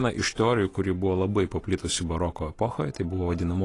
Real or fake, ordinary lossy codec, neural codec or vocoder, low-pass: real; AAC, 32 kbps; none; 10.8 kHz